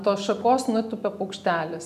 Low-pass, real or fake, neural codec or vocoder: 14.4 kHz; real; none